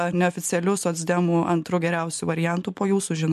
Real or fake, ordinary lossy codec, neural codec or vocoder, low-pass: real; MP3, 64 kbps; none; 14.4 kHz